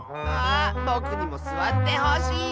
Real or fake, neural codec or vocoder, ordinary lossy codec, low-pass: real; none; none; none